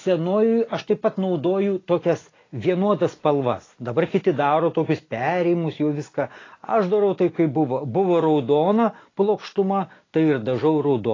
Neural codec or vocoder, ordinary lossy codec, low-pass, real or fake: none; AAC, 32 kbps; 7.2 kHz; real